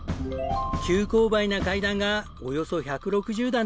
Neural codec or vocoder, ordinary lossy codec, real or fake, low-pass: none; none; real; none